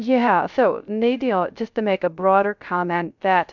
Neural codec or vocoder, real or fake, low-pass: codec, 16 kHz, 0.3 kbps, FocalCodec; fake; 7.2 kHz